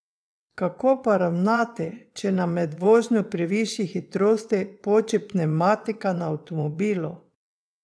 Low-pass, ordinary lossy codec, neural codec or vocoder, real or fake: none; none; vocoder, 22.05 kHz, 80 mel bands, WaveNeXt; fake